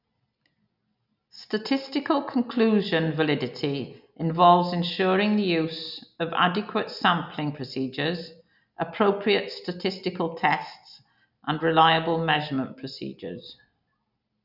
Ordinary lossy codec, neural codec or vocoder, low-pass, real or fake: none; none; 5.4 kHz; real